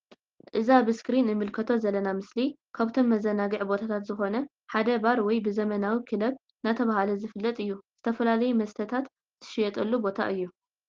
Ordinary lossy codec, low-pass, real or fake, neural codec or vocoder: Opus, 16 kbps; 7.2 kHz; real; none